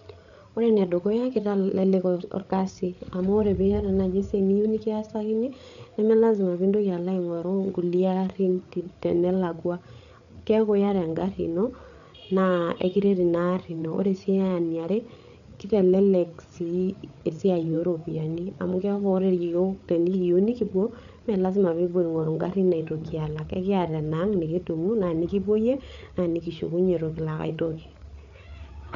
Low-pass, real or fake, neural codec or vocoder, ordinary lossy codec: 7.2 kHz; fake; codec, 16 kHz, 8 kbps, FreqCodec, larger model; none